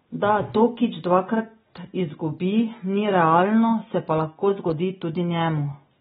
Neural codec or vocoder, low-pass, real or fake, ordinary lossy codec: none; 19.8 kHz; real; AAC, 16 kbps